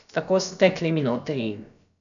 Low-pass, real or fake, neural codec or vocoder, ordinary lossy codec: 7.2 kHz; fake; codec, 16 kHz, about 1 kbps, DyCAST, with the encoder's durations; none